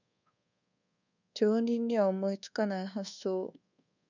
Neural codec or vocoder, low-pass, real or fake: codec, 24 kHz, 1.2 kbps, DualCodec; 7.2 kHz; fake